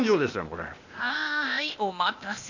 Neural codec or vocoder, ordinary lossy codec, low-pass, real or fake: codec, 16 kHz, 0.8 kbps, ZipCodec; none; 7.2 kHz; fake